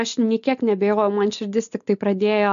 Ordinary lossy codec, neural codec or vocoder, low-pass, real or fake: AAC, 96 kbps; none; 7.2 kHz; real